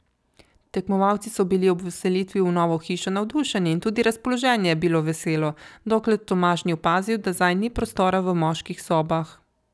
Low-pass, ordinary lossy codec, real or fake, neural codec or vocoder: none; none; real; none